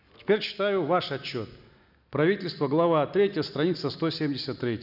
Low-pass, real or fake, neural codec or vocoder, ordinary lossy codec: 5.4 kHz; real; none; none